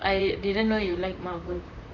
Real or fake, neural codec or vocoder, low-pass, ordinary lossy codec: fake; vocoder, 44.1 kHz, 128 mel bands, Pupu-Vocoder; 7.2 kHz; none